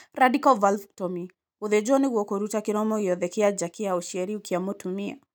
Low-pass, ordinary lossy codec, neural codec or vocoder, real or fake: none; none; none; real